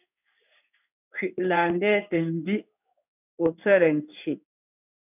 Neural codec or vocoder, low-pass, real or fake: codec, 16 kHz in and 24 kHz out, 1 kbps, XY-Tokenizer; 3.6 kHz; fake